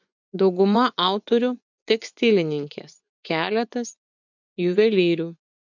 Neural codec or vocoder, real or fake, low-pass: vocoder, 24 kHz, 100 mel bands, Vocos; fake; 7.2 kHz